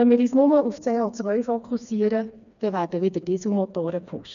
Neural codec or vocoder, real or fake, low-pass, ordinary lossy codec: codec, 16 kHz, 2 kbps, FreqCodec, smaller model; fake; 7.2 kHz; none